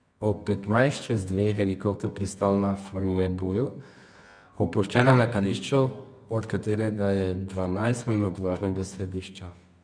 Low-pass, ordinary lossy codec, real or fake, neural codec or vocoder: 9.9 kHz; none; fake; codec, 24 kHz, 0.9 kbps, WavTokenizer, medium music audio release